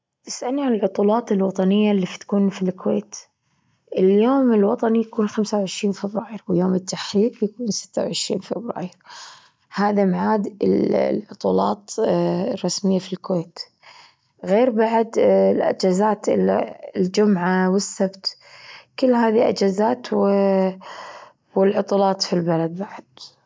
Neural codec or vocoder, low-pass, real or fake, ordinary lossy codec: none; none; real; none